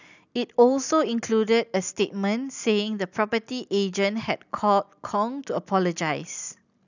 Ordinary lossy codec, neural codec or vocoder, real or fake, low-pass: none; none; real; 7.2 kHz